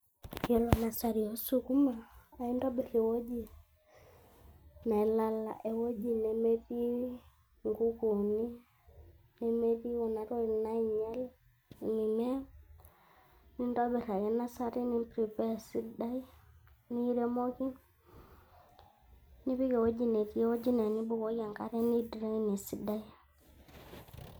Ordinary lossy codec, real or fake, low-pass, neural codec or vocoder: none; real; none; none